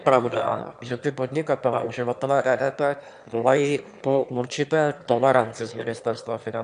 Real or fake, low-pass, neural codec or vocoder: fake; 9.9 kHz; autoencoder, 22.05 kHz, a latent of 192 numbers a frame, VITS, trained on one speaker